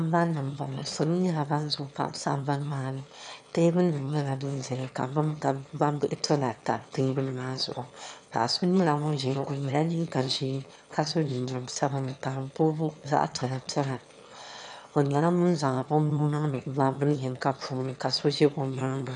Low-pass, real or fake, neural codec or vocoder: 9.9 kHz; fake; autoencoder, 22.05 kHz, a latent of 192 numbers a frame, VITS, trained on one speaker